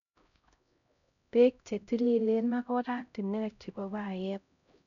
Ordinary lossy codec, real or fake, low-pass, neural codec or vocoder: none; fake; 7.2 kHz; codec, 16 kHz, 0.5 kbps, X-Codec, HuBERT features, trained on LibriSpeech